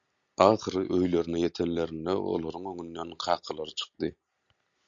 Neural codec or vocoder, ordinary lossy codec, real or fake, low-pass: none; Opus, 64 kbps; real; 7.2 kHz